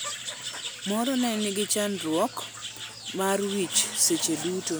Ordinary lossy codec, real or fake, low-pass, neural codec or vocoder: none; real; none; none